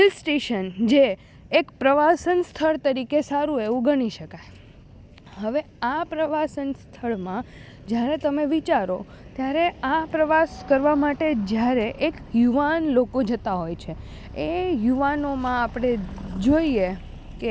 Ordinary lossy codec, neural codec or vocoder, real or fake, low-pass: none; none; real; none